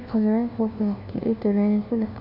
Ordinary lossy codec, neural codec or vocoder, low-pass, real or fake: none; codec, 16 kHz, 1 kbps, FunCodec, trained on LibriTTS, 50 frames a second; 5.4 kHz; fake